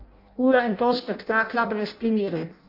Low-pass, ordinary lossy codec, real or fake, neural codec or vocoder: 5.4 kHz; MP3, 48 kbps; fake; codec, 16 kHz in and 24 kHz out, 0.6 kbps, FireRedTTS-2 codec